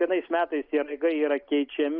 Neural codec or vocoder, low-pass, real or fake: none; 5.4 kHz; real